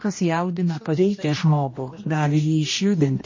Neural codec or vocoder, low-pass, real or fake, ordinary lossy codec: codec, 16 kHz, 1 kbps, X-Codec, HuBERT features, trained on general audio; 7.2 kHz; fake; MP3, 32 kbps